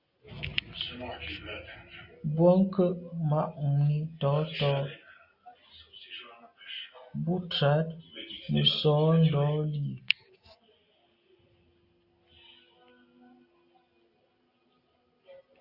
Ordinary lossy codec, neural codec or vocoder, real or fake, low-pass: Opus, 64 kbps; none; real; 5.4 kHz